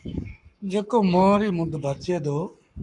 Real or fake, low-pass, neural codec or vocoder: fake; 10.8 kHz; codec, 44.1 kHz, 7.8 kbps, Pupu-Codec